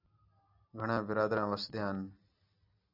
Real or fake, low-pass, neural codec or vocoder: real; 5.4 kHz; none